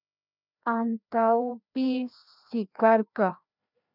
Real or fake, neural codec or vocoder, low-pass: fake; codec, 16 kHz, 1 kbps, FreqCodec, larger model; 5.4 kHz